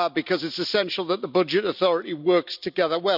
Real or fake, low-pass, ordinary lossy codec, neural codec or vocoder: real; 5.4 kHz; none; none